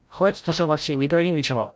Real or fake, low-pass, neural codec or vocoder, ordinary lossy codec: fake; none; codec, 16 kHz, 0.5 kbps, FreqCodec, larger model; none